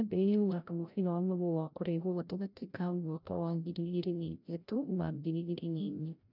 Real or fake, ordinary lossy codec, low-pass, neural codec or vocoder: fake; none; 5.4 kHz; codec, 16 kHz, 0.5 kbps, FreqCodec, larger model